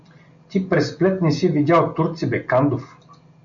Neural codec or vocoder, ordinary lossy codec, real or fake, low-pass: none; MP3, 64 kbps; real; 7.2 kHz